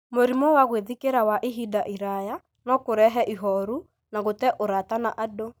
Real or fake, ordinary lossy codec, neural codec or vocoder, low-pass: real; none; none; none